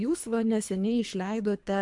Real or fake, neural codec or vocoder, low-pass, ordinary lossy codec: fake; codec, 24 kHz, 3 kbps, HILCodec; 10.8 kHz; MP3, 64 kbps